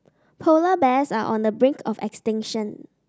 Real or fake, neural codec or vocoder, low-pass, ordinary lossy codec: real; none; none; none